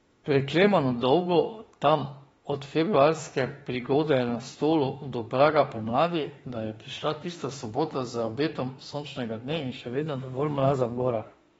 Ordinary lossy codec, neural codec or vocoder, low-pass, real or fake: AAC, 24 kbps; autoencoder, 48 kHz, 32 numbers a frame, DAC-VAE, trained on Japanese speech; 19.8 kHz; fake